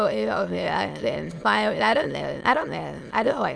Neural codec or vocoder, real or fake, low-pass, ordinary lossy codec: autoencoder, 22.05 kHz, a latent of 192 numbers a frame, VITS, trained on many speakers; fake; none; none